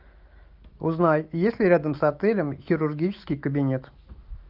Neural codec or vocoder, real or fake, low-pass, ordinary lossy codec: autoencoder, 48 kHz, 128 numbers a frame, DAC-VAE, trained on Japanese speech; fake; 5.4 kHz; Opus, 24 kbps